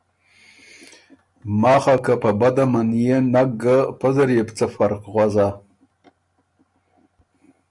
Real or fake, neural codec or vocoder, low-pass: real; none; 10.8 kHz